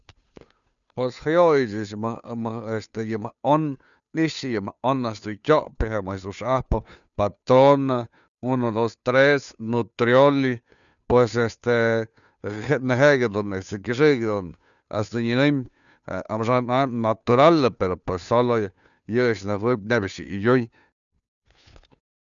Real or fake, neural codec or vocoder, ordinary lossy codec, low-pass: fake; codec, 16 kHz, 2 kbps, FunCodec, trained on Chinese and English, 25 frames a second; none; 7.2 kHz